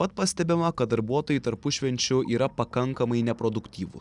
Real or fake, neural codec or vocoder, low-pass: real; none; 10.8 kHz